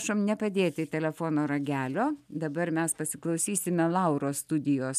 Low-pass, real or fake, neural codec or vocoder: 14.4 kHz; real; none